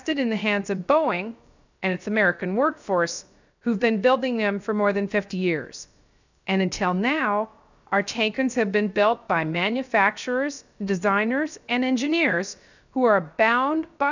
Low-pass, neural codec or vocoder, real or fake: 7.2 kHz; codec, 16 kHz, 0.3 kbps, FocalCodec; fake